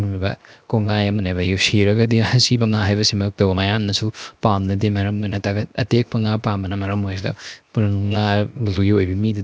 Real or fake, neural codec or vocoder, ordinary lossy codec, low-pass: fake; codec, 16 kHz, 0.7 kbps, FocalCodec; none; none